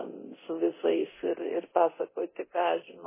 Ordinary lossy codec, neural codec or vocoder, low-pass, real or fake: MP3, 16 kbps; codec, 24 kHz, 0.9 kbps, DualCodec; 3.6 kHz; fake